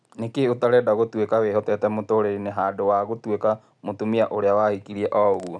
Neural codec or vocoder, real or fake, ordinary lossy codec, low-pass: none; real; none; 9.9 kHz